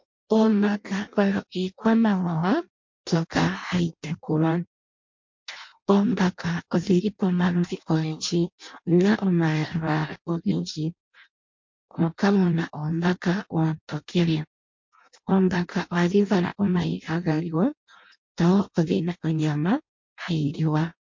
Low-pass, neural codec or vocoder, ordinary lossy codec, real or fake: 7.2 kHz; codec, 16 kHz in and 24 kHz out, 0.6 kbps, FireRedTTS-2 codec; MP3, 48 kbps; fake